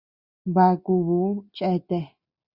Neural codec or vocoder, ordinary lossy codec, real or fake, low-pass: none; Opus, 64 kbps; real; 5.4 kHz